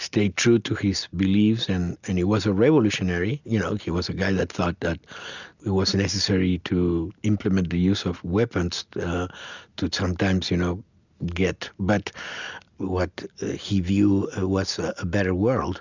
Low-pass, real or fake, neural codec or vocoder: 7.2 kHz; real; none